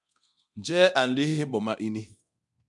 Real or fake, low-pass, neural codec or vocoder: fake; 10.8 kHz; codec, 24 kHz, 0.9 kbps, DualCodec